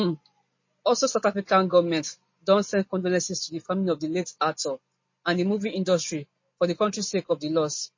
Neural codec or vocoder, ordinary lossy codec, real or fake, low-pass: none; MP3, 32 kbps; real; 7.2 kHz